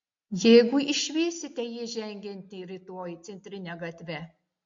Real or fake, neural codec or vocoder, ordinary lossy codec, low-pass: real; none; MP3, 48 kbps; 7.2 kHz